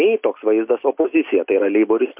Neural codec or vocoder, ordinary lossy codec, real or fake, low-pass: none; MP3, 32 kbps; real; 3.6 kHz